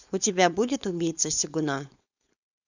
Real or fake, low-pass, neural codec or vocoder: fake; 7.2 kHz; codec, 16 kHz, 4.8 kbps, FACodec